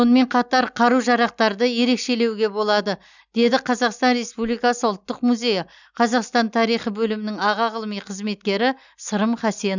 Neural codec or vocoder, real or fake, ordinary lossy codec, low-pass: none; real; none; 7.2 kHz